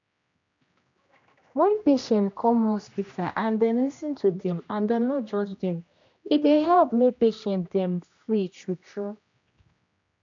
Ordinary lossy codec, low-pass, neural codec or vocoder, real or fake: MP3, 64 kbps; 7.2 kHz; codec, 16 kHz, 1 kbps, X-Codec, HuBERT features, trained on general audio; fake